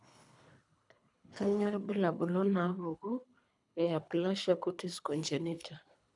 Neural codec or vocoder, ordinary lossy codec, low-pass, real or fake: codec, 24 kHz, 3 kbps, HILCodec; none; 10.8 kHz; fake